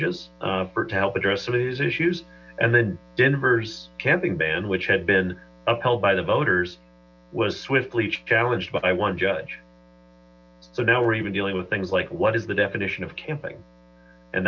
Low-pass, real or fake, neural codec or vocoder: 7.2 kHz; real; none